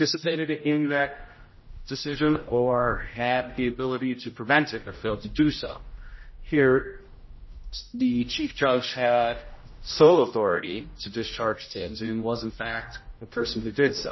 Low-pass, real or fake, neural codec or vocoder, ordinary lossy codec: 7.2 kHz; fake; codec, 16 kHz, 0.5 kbps, X-Codec, HuBERT features, trained on general audio; MP3, 24 kbps